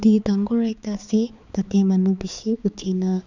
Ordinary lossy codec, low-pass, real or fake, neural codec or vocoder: none; 7.2 kHz; fake; codec, 16 kHz, 4 kbps, X-Codec, HuBERT features, trained on balanced general audio